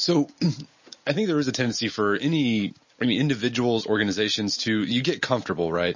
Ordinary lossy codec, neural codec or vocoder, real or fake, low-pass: MP3, 32 kbps; none; real; 7.2 kHz